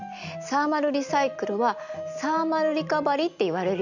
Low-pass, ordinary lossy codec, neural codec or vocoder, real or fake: 7.2 kHz; none; none; real